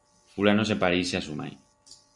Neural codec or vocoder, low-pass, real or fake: none; 10.8 kHz; real